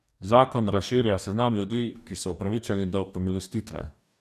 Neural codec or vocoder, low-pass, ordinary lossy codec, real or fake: codec, 44.1 kHz, 2.6 kbps, DAC; 14.4 kHz; none; fake